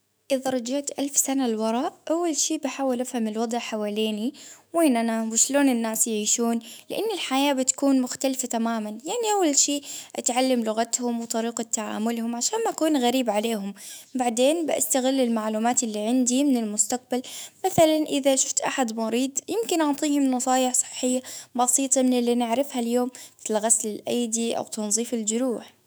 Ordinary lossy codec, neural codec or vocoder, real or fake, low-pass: none; autoencoder, 48 kHz, 128 numbers a frame, DAC-VAE, trained on Japanese speech; fake; none